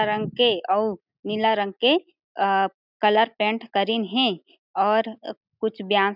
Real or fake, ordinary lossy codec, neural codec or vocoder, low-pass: real; none; none; 5.4 kHz